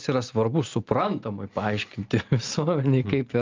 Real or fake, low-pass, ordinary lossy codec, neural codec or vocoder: real; 7.2 kHz; Opus, 24 kbps; none